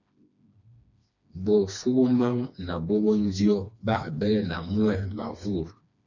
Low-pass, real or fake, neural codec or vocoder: 7.2 kHz; fake; codec, 16 kHz, 2 kbps, FreqCodec, smaller model